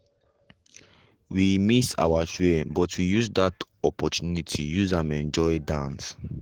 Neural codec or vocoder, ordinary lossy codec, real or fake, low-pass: codec, 44.1 kHz, 7.8 kbps, Pupu-Codec; Opus, 16 kbps; fake; 19.8 kHz